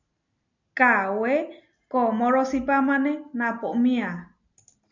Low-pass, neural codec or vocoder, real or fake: 7.2 kHz; none; real